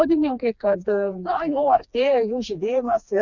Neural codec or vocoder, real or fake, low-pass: codec, 16 kHz, 2 kbps, FreqCodec, smaller model; fake; 7.2 kHz